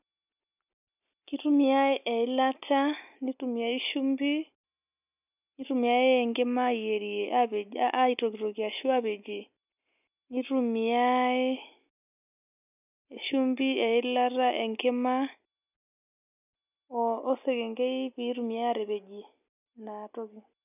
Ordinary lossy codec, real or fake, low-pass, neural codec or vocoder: none; real; 3.6 kHz; none